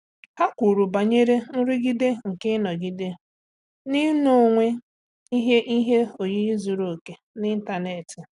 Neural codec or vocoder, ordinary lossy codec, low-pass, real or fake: none; none; 10.8 kHz; real